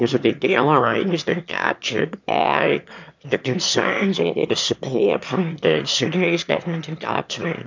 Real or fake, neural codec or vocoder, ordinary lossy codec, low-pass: fake; autoencoder, 22.05 kHz, a latent of 192 numbers a frame, VITS, trained on one speaker; MP3, 64 kbps; 7.2 kHz